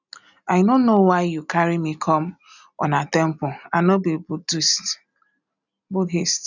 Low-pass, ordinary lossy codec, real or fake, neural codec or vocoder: 7.2 kHz; none; real; none